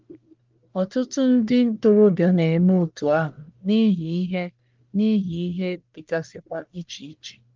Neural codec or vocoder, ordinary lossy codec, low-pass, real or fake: codec, 44.1 kHz, 1.7 kbps, Pupu-Codec; Opus, 24 kbps; 7.2 kHz; fake